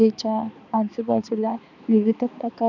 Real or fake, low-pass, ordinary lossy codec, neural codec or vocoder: fake; 7.2 kHz; none; codec, 16 kHz, 2 kbps, X-Codec, HuBERT features, trained on balanced general audio